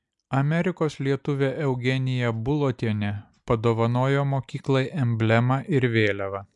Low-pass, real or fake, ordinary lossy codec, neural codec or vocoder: 10.8 kHz; real; MP3, 96 kbps; none